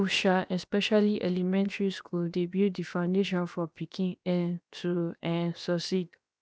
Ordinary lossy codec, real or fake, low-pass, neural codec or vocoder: none; fake; none; codec, 16 kHz, 0.7 kbps, FocalCodec